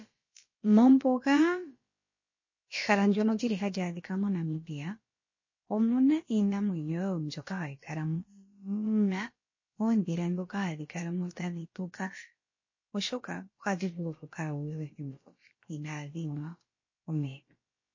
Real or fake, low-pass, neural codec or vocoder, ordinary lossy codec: fake; 7.2 kHz; codec, 16 kHz, about 1 kbps, DyCAST, with the encoder's durations; MP3, 32 kbps